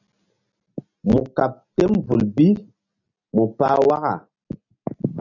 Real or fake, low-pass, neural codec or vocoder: real; 7.2 kHz; none